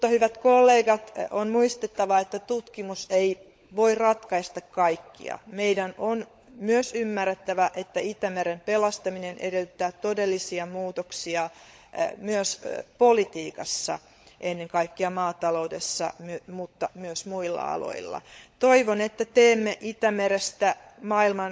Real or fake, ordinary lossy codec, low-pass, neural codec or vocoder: fake; none; none; codec, 16 kHz, 16 kbps, FunCodec, trained on LibriTTS, 50 frames a second